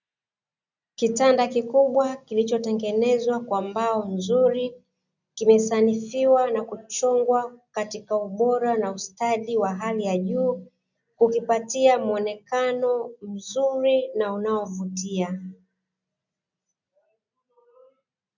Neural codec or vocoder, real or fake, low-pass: none; real; 7.2 kHz